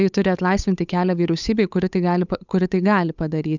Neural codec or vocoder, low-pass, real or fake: codec, 16 kHz, 8 kbps, FunCodec, trained on Chinese and English, 25 frames a second; 7.2 kHz; fake